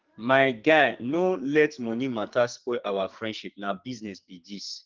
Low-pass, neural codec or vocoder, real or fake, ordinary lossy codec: 7.2 kHz; codec, 44.1 kHz, 2.6 kbps, SNAC; fake; Opus, 32 kbps